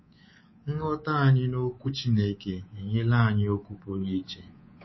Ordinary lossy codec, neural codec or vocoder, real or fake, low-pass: MP3, 24 kbps; codec, 24 kHz, 3.1 kbps, DualCodec; fake; 7.2 kHz